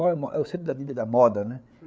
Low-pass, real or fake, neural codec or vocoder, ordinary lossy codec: none; fake; codec, 16 kHz, 8 kbps, FreqCodec, larger model; none